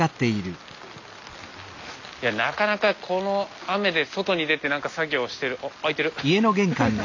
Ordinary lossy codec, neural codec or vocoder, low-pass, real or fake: none; none; 7.2 kHz; real